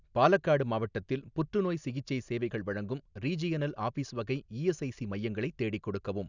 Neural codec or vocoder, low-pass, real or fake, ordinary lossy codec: none; 7.2 kHz; real; none